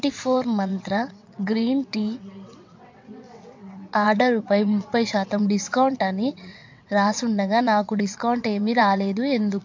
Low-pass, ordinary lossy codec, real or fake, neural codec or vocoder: 7.2 kHz; MP3, 48 kbps; fake; vocoder, 22.05 kHz, 80 mel bands, WaveNeXt